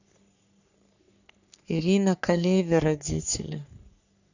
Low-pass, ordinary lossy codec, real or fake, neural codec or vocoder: 7.2 kHz; none; fake; codec, 44.1 kHz, 3.4 kbps, Pupu-Codec